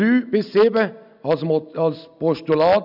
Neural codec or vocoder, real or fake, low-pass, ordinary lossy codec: none; real; 5.4 kHz; none